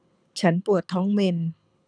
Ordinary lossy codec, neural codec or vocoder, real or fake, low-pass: none; codec, 24 kHz, 6 kbps, HILCodec; fake; 9.9 kHz